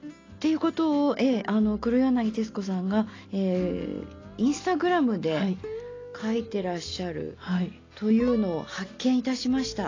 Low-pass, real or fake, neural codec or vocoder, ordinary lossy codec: 7.2 kHz; real; none; AAC, 32 kbps